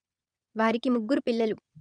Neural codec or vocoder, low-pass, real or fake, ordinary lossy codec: vocoder, 22.05 kHz, 80 mel bands, Vocos; 9.9 kHz; fake; none